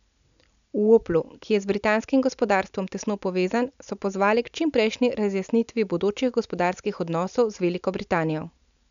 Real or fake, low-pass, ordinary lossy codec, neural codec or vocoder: real; 7.2 kHz; none; none